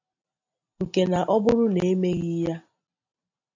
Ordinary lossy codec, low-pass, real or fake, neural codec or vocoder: AAC, 48 kbps; 7.2 kHz; real; none